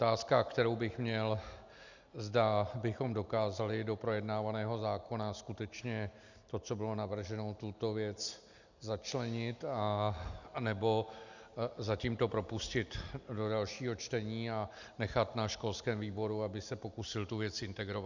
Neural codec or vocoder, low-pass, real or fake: none; 7.2 kHz; real